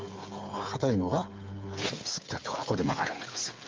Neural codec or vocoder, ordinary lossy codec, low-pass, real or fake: codec, 16 kHz, 8 kbps, FreqCodec, smaller model; Opus, 32 kbps; 7.2 kHz; fake